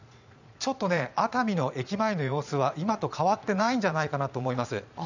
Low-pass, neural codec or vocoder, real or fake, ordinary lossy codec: 7.2 kHz; vocoder, 22.05 kHz, 80 mel bands, Vocos; fake; none